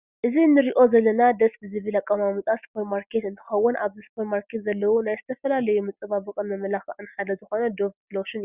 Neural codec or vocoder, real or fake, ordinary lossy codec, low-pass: none; real; Opus, 64 kbps; 3.6 kHz